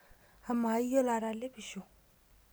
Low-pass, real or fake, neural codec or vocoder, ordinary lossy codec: none; real; none; none